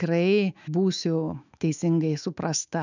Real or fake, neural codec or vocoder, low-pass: fake; autoencoder, 48 kHz, 128 numbers a frame, DAC-VAE, trained on Japanese speech; 7.2 kHz